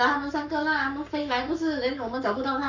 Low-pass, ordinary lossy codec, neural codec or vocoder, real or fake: 7.2 kHz; none; codec, 44.1 kHz, 7.8 kbps, Pupu-Codec; fake